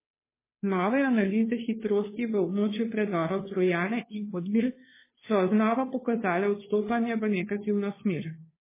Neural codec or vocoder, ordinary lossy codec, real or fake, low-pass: codec, 16 kHz, 2 kbps, FunCodec, trained on Chinese and English, 25 frames a second; MP3, 16 kbps; fake; 3.6 kHz